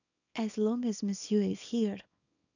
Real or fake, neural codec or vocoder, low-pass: fake; codec, 24 kHz, 0.9 kbps, WavTokenizer, small release; 7.2 kHz